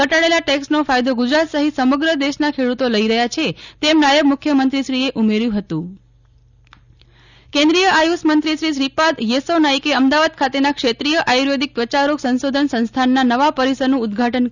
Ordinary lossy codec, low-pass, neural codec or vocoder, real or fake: none; 7.2 kHz; none; real